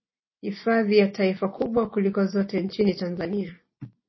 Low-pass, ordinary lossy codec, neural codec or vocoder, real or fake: 7.2 kHz; MP3, 24 kbps; none; real